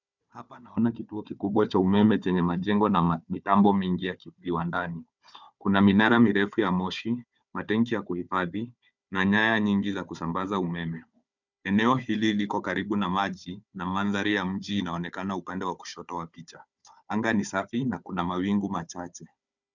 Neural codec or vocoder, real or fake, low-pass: codec, 16 kHz, 4 kbps, FunCodec, trained on Chinese and English, 50 frames a second; fake; 7.2 kHz